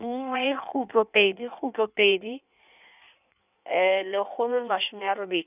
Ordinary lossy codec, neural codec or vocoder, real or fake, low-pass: none; codec, 16 kHz in and 24 kHz out, 1.1 kbps, FireRedTTS-2 codec; fake; 3.6 kHz